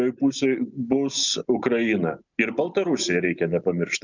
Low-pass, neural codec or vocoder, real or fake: 7.2 kHz; none; real